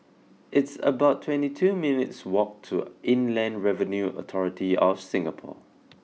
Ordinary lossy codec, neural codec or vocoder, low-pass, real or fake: none; none; none; real